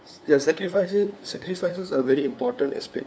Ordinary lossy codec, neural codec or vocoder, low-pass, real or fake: none; codec, 16 kHz, 2 kbps, FunCodec, trained on LibriTTS, 25 frames a second; none; fake